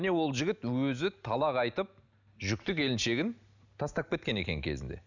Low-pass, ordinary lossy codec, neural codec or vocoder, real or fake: 7.2 kHz; none; none; real